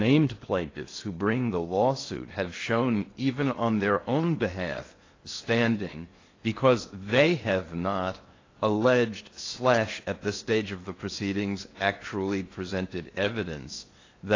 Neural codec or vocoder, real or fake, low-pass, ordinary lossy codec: codec, 16 kHz in and 24 kHz out, 0.8 kbps, FocalCodec, streaming, 65536 codes; fake; 7.2 kHz; AAC, 32 kbps